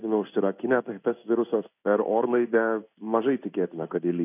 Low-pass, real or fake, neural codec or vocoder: 3.6 kHz; fake; codec, 16 kHz in and 24 kHz out, 1 kbps, XY-Tokenizer